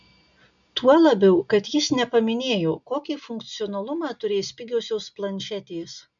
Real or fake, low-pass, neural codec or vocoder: real; 7.2 kHz; none